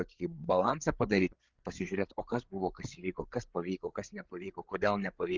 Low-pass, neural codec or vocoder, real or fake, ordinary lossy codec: 7.2 kHz; codec, 16 kHz, 8 kbps, FreqCodec, larger model; fake; Opus, 24 kbps